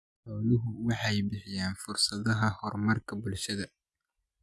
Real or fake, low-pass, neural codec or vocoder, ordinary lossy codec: real; none; none; none